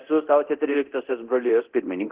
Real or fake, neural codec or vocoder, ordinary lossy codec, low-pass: fake; codec, 24 kHz, 0.9 kbps, DualCodec; Opus, 16 kbps; 3.6 kHz